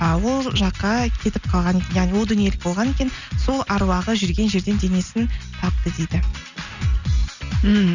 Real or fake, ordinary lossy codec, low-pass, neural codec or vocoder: real; none; 7.2 kHz; none